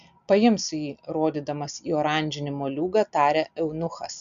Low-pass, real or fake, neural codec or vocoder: 7.2 kHz; real; none